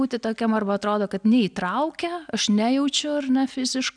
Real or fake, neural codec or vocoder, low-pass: real; none; 9.9 kHz